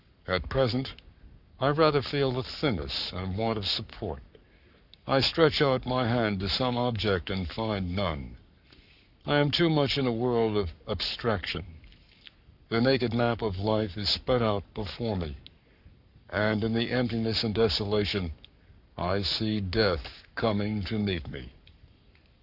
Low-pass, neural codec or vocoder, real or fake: 5.4 kHz; codec, 44.1 kHz, 7.8 kbps, Pupu-Codec; fake